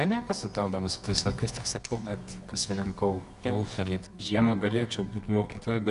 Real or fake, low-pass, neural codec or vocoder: fake; 10.8 kHz; codec, 24 kHz, 0.9 kbps, WavTokenizer, medium music audio release